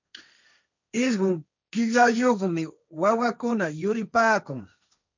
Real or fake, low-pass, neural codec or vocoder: fake; 7.2 kHz; codec, 16 kHz, 1.1 kbps, Voila-Tokenizer